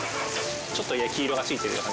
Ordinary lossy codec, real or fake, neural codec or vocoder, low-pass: none; real; none; none